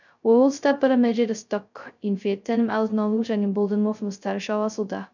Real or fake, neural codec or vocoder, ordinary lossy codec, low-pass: fake; codec, 16 kHz, 0.2 kbps, FocalCodec; none; 7.2 kHz